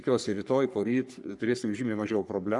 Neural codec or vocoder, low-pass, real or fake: codec, 44.1 kHz, 3.4 kbps, Pupu-Codec; 10.8 kHz; fake